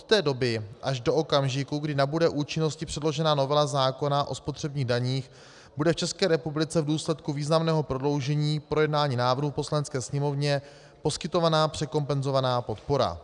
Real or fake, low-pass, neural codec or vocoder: real; 10.8 kHz; none